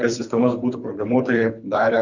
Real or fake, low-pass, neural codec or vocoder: fake; 7.2 kHz; codec, 24 kHz, 3 kbps, HILCodec